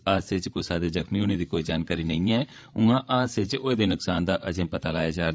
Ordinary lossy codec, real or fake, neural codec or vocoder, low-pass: none; fake; codec, 16 kHz, 8 kbps, FreqCodec, larger model; none